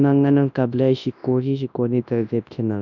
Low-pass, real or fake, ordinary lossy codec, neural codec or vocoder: 7.2 kHz; fake; none; codec, 24 kHz, 0.9 kbps, WavTokenizer, large speech release